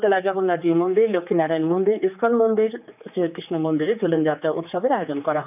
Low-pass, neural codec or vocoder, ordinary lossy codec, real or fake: 3.6 kHz; codec, 16 kHz, 4 kbps, X-Codec, HuBERT features, trained on general audio; none; fake